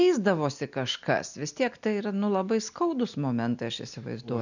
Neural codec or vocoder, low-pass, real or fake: none; 7.2 kHz; real